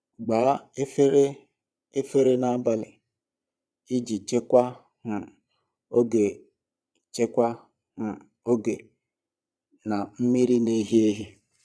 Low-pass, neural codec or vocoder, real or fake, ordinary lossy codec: none; vocoder, 22.05 kHz, 80 mel bands, Vocos; fake; none